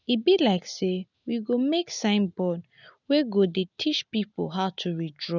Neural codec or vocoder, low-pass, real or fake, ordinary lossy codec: none; 7.2 kHz; real; none